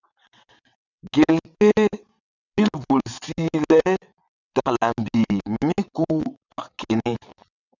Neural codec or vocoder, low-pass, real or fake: vocoder, 22.05 kHz, 80 mel bands, WaveNeXt; 7.2 kHz; fake